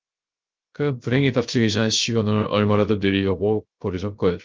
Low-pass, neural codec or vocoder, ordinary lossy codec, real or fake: 7.2 kHz; codec, 16 kHz, 0.3 kbps, FocalCodec; Opus, 24 kbps; fake